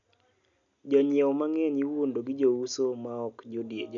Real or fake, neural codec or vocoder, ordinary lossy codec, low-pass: real; none; none; 7.2 kHz